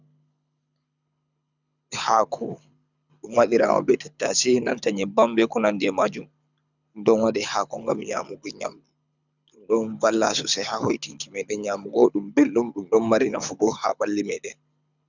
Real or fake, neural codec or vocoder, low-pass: fake; codec, 24 kHz, 6 kbps, HILCodec; 7.2 kHz